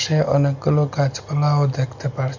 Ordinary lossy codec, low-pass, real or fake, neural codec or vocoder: none; 7.2 kHz; real; none